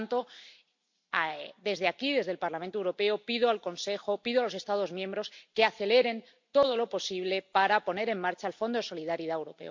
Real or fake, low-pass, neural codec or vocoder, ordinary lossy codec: real; 7.2 kHz; none; MP3, 48 kbps